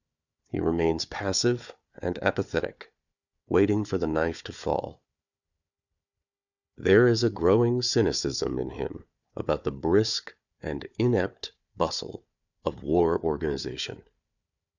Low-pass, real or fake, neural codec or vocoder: 7.2 kHz; fake; codec, 16 kHz, 4 kbps, FunCodec, trained on Chinese and English, 50 frames a second